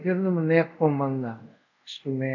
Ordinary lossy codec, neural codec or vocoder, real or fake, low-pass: none; codec, 24 kHz, 0.5 kbps, DualCodec; fake; 7.2 kHz